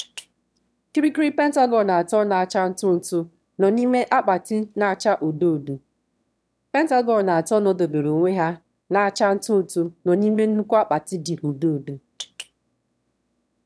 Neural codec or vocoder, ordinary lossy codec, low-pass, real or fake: autoencoder, 22.05 kHz, a latent of 192 numbers a frame, VITS, trained on one speaker; none; none; fake